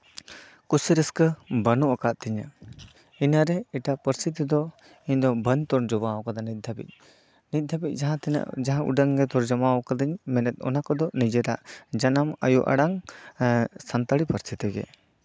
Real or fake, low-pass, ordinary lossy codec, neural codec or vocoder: real; none; none; none